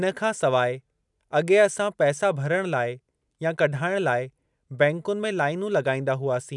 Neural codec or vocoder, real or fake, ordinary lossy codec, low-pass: none; real; none; 10.8 kHz